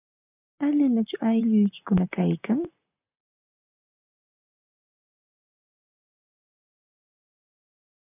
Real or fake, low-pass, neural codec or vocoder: fake; 3.6 kHz; vocoder, 44.1 kHz, 128 mel bands, Pupu-Vocoder